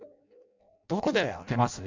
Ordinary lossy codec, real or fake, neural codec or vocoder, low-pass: MP3, 64 kbps; fake; codec, 16 kHz in and 24 kHz out, 0.6 kbps, FireRedTTS-2 codec; 7.2 kHz